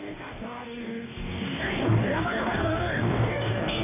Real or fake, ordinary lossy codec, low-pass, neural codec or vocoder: fake; none; 3.6 kHz; codec, 44.1 kHz, 2.6 kbps, DAC